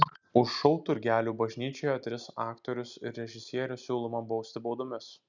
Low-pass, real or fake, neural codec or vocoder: 7.2 kHz; real; none